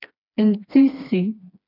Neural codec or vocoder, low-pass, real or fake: codec, 16 kHz, 2 kbps, FreqCodec, smaller model; 5.4 kHz; fake